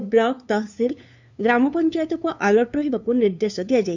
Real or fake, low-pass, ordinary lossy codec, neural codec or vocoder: fake; 7.2 kHz; none; codec, 16 kHz, 2 kbps, FunCodec, trained on LibriTTS, 25 frames a second